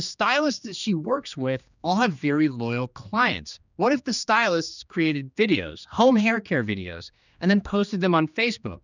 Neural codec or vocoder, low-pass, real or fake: codec, 16 kHz, 2 kbps, X-Codec, HuBERT features, trained on general audio; 7.2 kHz; fake